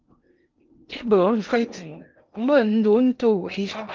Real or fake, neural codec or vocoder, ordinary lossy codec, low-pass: fake; codec, 16 kHz in and 24 kHz out, 0.6 kbps, FocalCodec, streaming, 2048 codes; Opus, 24 kbps; 7.2 kHz